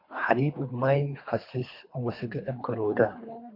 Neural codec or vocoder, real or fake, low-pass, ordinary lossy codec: codec, 24 kHz, 3 kbps, HILCodec; fake; 5.4 kHz; MP3, 32 kbps